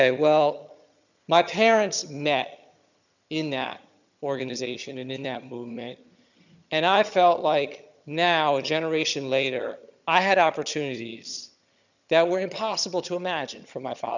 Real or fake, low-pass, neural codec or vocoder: fake; 7.2 kHz; vocoder, 22.05 kHz, 80 mel bands, HiFi-GAN